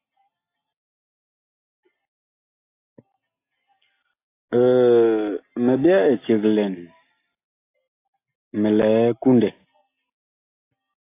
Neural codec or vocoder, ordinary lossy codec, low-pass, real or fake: none; AAC, 24 kbps; 3.6 kHz; real